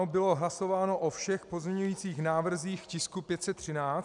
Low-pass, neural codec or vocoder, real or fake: 10.8 kHz; none; real